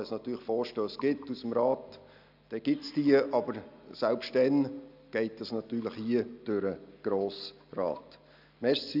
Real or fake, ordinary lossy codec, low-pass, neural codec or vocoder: real; none; 5.4 kHz; none